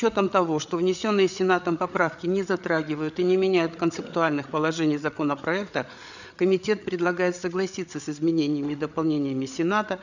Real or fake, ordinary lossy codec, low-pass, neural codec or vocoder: fake; none; 7.2 kHz; codec, 16 kHz, 8 kbps, FreqCodec, larger model